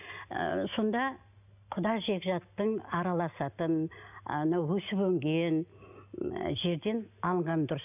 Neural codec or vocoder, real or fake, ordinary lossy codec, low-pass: none; real; none; 3.6 kHz